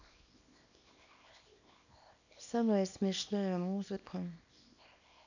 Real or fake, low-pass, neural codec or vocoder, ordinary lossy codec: fake; 7.2 kHz; codec, 24 kHz, 0.9 kbps, WavTokenizer, small release; none